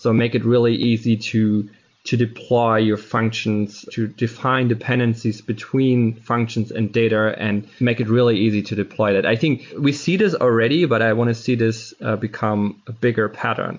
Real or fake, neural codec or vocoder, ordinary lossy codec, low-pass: real; none; MP3, 48 kbps; 7.2 kHz